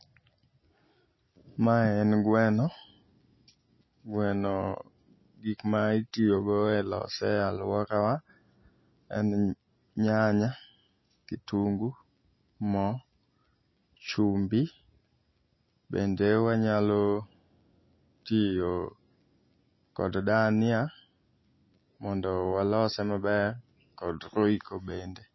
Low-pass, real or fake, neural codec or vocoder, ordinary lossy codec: 7.2 kHz; real; none; MP3, 24 kbps